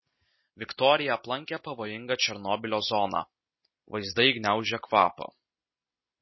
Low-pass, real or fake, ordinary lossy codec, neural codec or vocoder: 7.2 kHz; real; MP3, 24 kbps; none